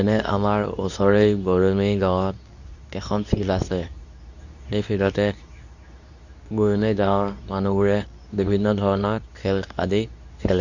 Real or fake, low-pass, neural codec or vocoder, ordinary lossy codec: fake; 7.2 kHz; codec, 24 kHz, 0.9 kbps, WavTokenizer, medium speech release version 2; none